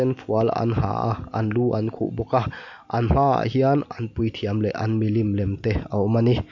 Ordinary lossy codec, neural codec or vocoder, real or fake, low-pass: none; none; real; 7.2 kHz